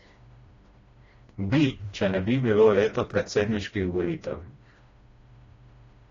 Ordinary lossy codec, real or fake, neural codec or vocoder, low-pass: AAC, 32 kbps; fake; codec, 16 kHz, 1 kbps, FreqCodec, smaller model; 7.2 kHz